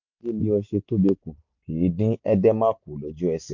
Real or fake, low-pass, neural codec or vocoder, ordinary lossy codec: real; 7.2 kHz; none; MP3, 48 kbps